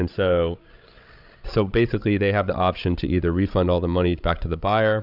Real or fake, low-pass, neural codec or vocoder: fake; 5.4 kHz; codec, 16 kHz, 16 kbps, FreqCodec, larger model